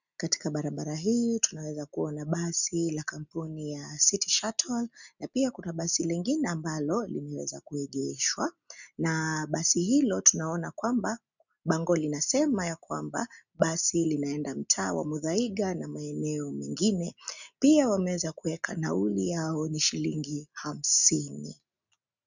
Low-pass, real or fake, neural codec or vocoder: 7.2 kHz; real; none